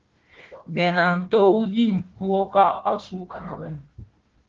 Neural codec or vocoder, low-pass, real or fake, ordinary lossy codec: codec, 16 kHz, 1 kbps, FunCodec, trained on Chinese and English, 50 frames a second; 7.2 kHz; fake; Opus, 16 kbps